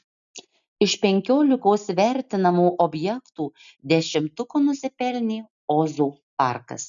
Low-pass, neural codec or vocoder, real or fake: 7.2 kHz; none; real